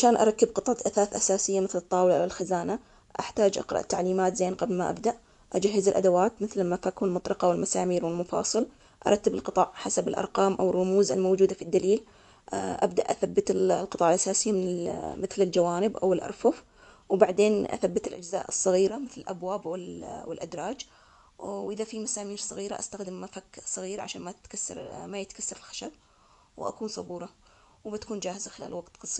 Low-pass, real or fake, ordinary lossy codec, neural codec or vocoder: 10.8 kHz; fake; none; vocoder, 24 kHz, 100 mel bands, Vocos